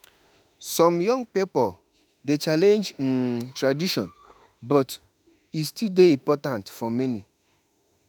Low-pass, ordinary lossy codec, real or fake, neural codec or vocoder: none; none; fake; autoencoder, 48 kHz, 32 numbers a frame, DAC-VAE, trained on Japanese speech